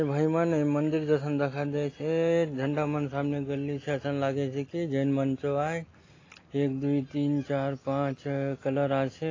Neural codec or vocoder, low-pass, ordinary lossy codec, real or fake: none; 7.2 kHz; AAC, 32 kbps; real